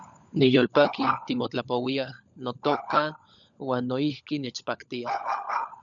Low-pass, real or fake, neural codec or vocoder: 7.2 kHz; fake; codec, 16 kHz, 16 kbps, FunCodec, trained on LibriTTS, 50 frames a second